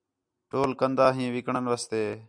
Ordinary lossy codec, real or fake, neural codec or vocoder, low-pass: MP3, 96 kbps; real; none; 9.9 kHz